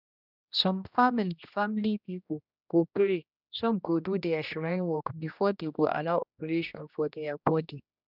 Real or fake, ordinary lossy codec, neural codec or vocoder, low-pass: fake; none; codec, 16 kHz, 1 kbps, X-Codec, HuBERT features, trained on general audio; 5.4 kHz